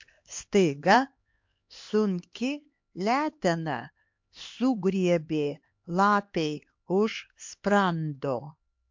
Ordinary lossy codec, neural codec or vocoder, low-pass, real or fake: MP3, 48 kbps; codec, 16 kHz, 2 kbps, X-Codec, HuBERT features, trained on LibriSpeech; 7.2 kHz; fake